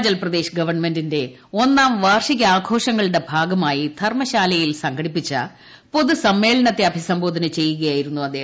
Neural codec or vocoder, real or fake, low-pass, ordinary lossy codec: none; real; none; none